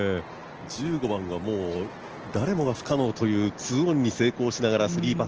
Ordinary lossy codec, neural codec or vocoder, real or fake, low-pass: Opus, 16 kbps; none; real; 7.2 kHz